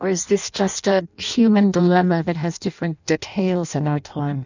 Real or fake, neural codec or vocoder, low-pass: fake; codec, 16 kHz in and 24 kHz out, 0.6 kbps, FireRedTTS-2 codec; 7.2 kHz